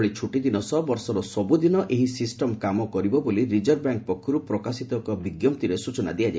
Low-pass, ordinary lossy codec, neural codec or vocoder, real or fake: none; none; none; real